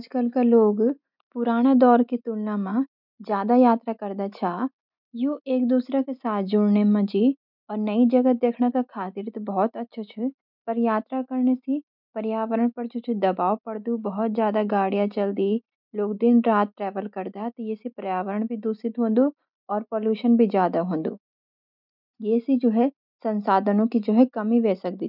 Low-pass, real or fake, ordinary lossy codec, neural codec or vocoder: 5.4 kHz; real; none; none